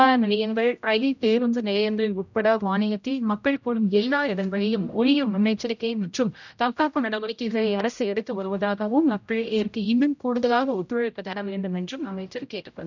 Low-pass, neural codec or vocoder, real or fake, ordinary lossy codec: 7.2 kHz; codec, 16 kHz, 0.5 kbps, X-Codec, HuBERT features, trained on general audio; fake; none